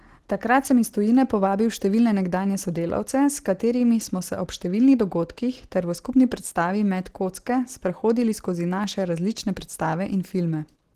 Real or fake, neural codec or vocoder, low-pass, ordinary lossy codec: real; none; 14.4 kHz; Opus, 16 kbps